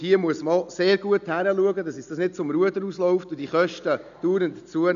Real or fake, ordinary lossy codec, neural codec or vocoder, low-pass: real; none; none; 7.2 kHz